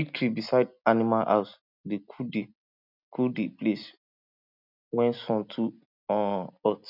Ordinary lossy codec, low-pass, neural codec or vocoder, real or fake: none; 5.4 kHz; none; real